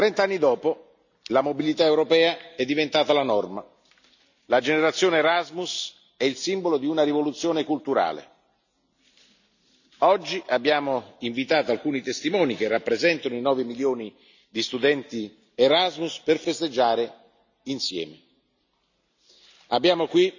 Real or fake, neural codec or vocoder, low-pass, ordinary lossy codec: real; none; 7.2 kHz; none